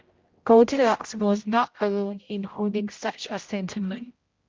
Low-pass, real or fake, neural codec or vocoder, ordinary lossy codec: 7.2 kHz; fake; codec, 16 kHz, 0.5 kbps, X-Codec, HuBERT features, trained on general audio; Opus, 32 kbps